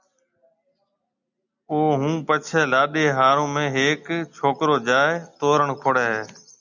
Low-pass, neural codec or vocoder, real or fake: 7.2 kHz; none; real